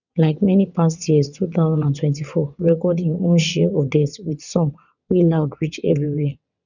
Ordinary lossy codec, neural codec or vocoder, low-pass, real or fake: none; vocoder, 22.05 kHz, 80 mel bands, WaveNeXt; 7.2 kHz; fake